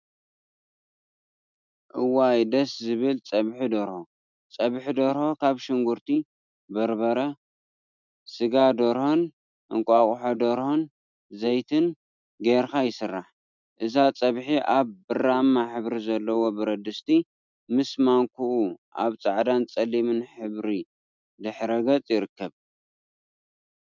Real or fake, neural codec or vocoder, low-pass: real; none; 7.2 kHz